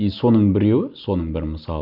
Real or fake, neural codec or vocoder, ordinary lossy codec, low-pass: real; none; none; 5.4 kHz